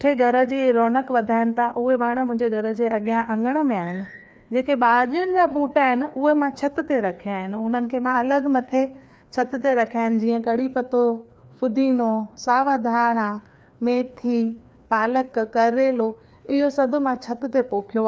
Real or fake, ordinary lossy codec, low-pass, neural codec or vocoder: fake; none; none; codec, 16 kHz, 2 kbps, FreqCodec, larger model